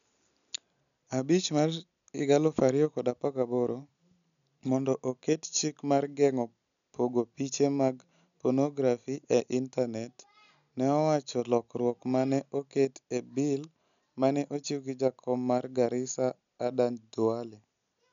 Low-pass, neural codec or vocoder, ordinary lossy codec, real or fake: 7.2 kHz; none; none; real